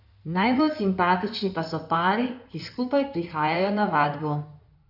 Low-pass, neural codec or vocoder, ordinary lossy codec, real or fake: 5.4 kHz; codec, 16 kHz in and 24 kHz out, 2.2 kbps, FireRedTTS-2 codec; none; fake